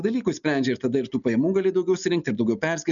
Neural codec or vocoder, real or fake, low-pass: none; real; 7.2 kHz